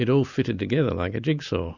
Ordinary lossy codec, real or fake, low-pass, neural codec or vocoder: Opus, 64 kbps; real; 7.2 kHz; none